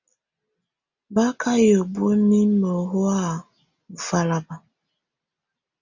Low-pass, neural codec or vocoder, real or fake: 7.2 kHz; none; real